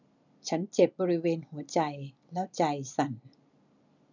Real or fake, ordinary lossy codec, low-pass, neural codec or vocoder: real; none; 7.2 kHz; none